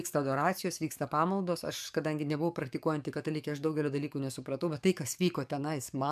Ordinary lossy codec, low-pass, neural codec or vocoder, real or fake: MP3, 96 kbps; 14.4 kHz; codec, 44.1 kHz, 7.8 kbps, DAC; fake